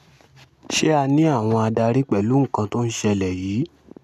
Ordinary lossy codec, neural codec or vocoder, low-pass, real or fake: none; none; 14.4 kHz; real